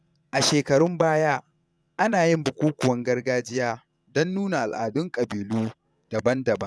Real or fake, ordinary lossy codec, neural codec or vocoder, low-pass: fake; none; vocoder, 22.05 kHz, 80 mel bands, Vocos; none